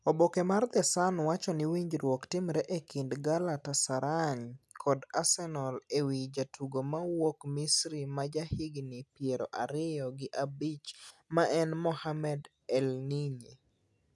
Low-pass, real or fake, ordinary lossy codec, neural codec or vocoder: none; real; none; none